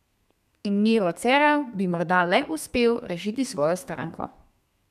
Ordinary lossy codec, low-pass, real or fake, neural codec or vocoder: none; 14.4 kHz; fake; codec, 32 kHz, 1.9 kbps, SNAC